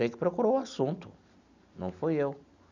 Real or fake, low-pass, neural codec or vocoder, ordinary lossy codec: real; 7.2 kHz; none; none